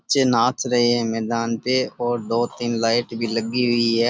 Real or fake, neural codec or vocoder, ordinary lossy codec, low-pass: real; none; none; none